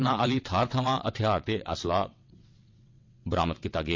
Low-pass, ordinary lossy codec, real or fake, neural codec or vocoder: 7.2 kHz; MP3, 48 kbps; fake; vocoder, 22.05 kHz, 80 mel bands, WaveNeXt